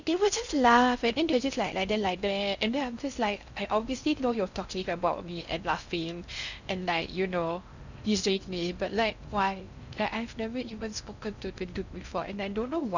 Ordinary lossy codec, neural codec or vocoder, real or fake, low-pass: none; codec, 16 kHz in and 24 kHz out, 0.6 kbps, FocalCodec, streaming, 4096 codes; fake; 7.2 kHz